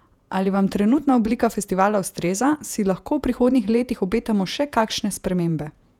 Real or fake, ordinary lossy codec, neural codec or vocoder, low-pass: fake; none; vocoder, 48 kHz, 128 mel bands, Vocos; 19.8 kHz